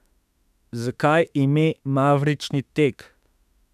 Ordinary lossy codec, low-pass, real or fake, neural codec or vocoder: none; 14.4 kHz; fake; autoencoder, 48 kHz, 32 numbers a frame, DAC-VAE, trained on Japanese speech